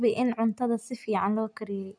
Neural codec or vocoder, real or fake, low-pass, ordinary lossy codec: none; real; 9.9 kHz; none